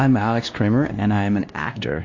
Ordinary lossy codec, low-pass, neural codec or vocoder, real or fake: AAC, 48 kbps; 7.2 kHz; codec, 16 kHz, 1 kbps, X-Codec, WavLM features, trained on Multilingual LibriSpeech; fake